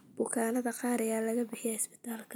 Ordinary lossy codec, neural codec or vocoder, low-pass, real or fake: none; none; none; real